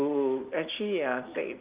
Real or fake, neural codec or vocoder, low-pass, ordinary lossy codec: real; none; 3.6 kHz; Opus, 24 kbps